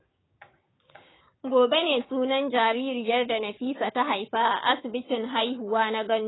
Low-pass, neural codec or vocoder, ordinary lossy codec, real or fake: 7.2 kHz; codec, 16 kHz, 6 kbps, DAC; AAC, 16 kbps; fake